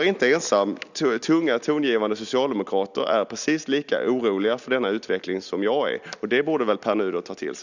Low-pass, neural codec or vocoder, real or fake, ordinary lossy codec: 7.2 kHz; none; real; none